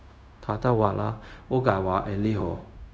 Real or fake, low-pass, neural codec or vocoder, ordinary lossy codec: fake; none; codec, 16 kHz, 0.4 kbps, LongCat-Audio-Codec; none